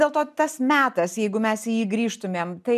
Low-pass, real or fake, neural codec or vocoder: 14.4 kHz; real; none